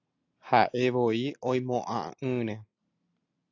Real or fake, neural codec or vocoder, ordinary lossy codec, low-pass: real; none; AAC, 48 kbps; 7.2 kHz